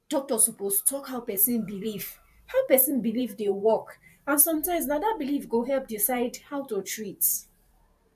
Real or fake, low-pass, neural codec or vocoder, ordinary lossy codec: fake; 14.4 kHz; vocoder, 44.1 kHz, 128 mel bands every 512 samples, BigVGAN v2; AAC, 96 kbps